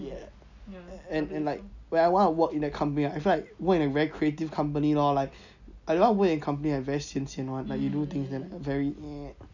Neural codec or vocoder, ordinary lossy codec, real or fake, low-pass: none; none; real; 7.2 kHz